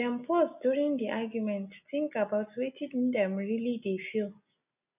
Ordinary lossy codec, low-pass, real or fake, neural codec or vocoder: none; 3.6 kHz; real; none